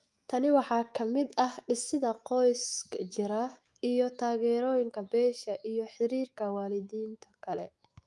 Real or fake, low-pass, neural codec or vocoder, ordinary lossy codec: fake; 10.8 kHz; autoencoder, 48 kHz, 128 numbers a frame, DAC-VAE, trained on Japanese speech; Opus, 32 kbps